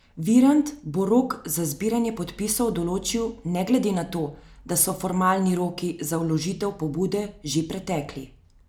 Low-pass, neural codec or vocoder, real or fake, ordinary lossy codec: none; none; real; none